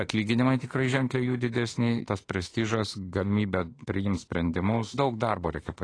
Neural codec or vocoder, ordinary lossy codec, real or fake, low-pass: autoencoder, 48 kHz, 32 numbers a frame, DAC-VAE, trained on Japanese speech; AAC, 32 kbps; fake; 9.9 kHz